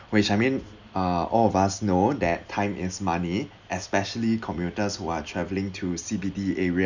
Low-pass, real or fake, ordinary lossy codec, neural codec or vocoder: 7.2 kHz; real; none; none